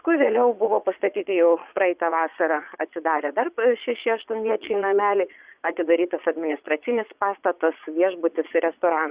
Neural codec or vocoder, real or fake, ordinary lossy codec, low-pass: vocoder, 22.05 kHz, 80 mel bands, Vocos; fake; Opus, 64 kbps; 3.6 kHz